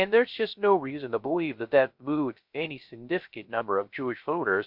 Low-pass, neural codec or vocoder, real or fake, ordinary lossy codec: 5.4 kHz; codec, 16 kHz, 0.3 kbps, FocalCodec; fake; MP3, 48 kbps